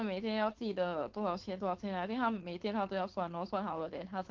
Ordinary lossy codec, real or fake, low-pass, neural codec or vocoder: Opus, 16 kbps; fake; 7.2 kHz; codec, 16 kHz, 4.8 kbps, FACodec